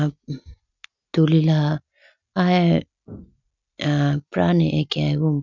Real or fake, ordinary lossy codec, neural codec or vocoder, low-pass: real; none; none; 7.2 kHz